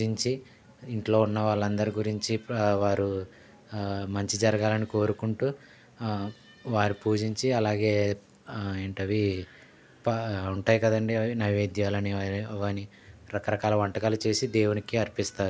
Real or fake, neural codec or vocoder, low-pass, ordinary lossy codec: real; none; none; none